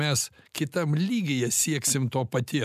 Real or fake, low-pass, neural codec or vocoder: real; 14.4 kHz; none